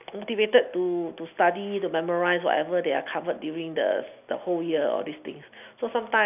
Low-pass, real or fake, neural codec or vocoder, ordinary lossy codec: 3.6 kHz; real; none; none